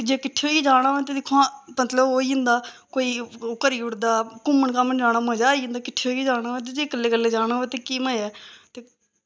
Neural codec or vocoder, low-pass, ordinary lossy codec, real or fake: none; none; none; real